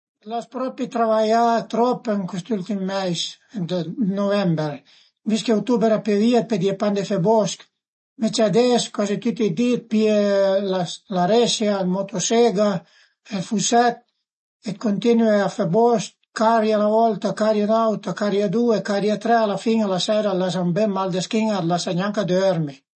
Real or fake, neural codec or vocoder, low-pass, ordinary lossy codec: real; none; 10.8 kHz; MP3, 32 kbps